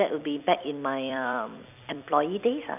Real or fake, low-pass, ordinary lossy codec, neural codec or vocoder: fake; 3.6 kHz; none; vocoder, 44.1 kHz, 128 mel bands every 256 samples, BigVGAN v2